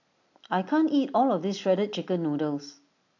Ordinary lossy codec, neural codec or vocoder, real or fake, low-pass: AAC, 48 kbps; none; real; 7.2 kHz